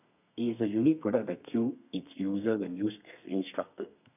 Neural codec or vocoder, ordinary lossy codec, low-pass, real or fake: codec, 32 kHz, 1.9 kbps, SNAC; none; 3.6 kHz; fake